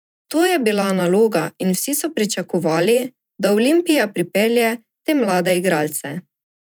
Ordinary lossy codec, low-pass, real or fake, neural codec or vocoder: none; none; fake; vocoder, 44.1 kHz, 128 mel bands every 512 samples, BigVGAN v2